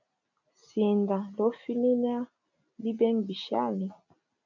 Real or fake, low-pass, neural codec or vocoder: real; 7.2 kHz; none